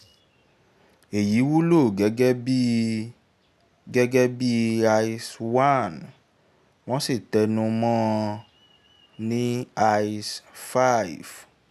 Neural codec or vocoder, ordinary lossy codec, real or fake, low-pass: none; none; real; 14.4 kHz